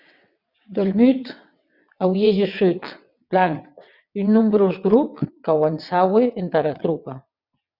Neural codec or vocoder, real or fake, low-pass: vocoder, 22.05 kHz, 80 mel bands, WaveNeXt; fake; 5.4 kHz